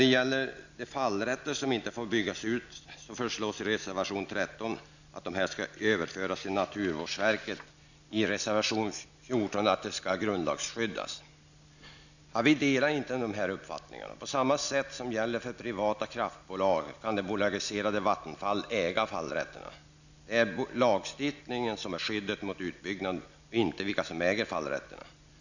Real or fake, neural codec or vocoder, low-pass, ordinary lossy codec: real; none; 7.2 kHz; none